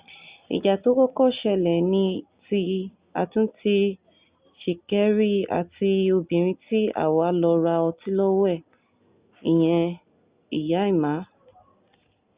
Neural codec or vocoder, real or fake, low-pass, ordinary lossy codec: none; real; 3.6 kHz; Opus, 64 kbps